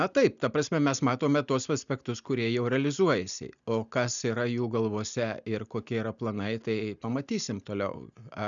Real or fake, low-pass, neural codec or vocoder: real; 7.2 kHz; none